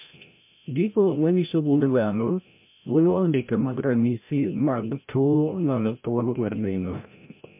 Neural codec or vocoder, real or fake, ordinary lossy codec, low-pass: codec, 16 kHz, 0.5 kbps, FreqCodec, larger model; fake; MP3, 32 kbps; 3.6 kHz